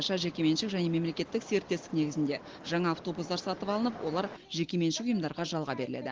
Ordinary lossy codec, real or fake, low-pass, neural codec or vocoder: Opus, 16 kbps; real; 7.2 kHz; none